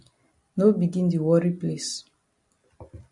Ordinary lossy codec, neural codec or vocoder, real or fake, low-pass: MP3, 48 kbps; none; real; 10.8 kHz